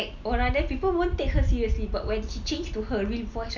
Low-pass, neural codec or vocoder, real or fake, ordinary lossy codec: 7.2 kHz; none; real; none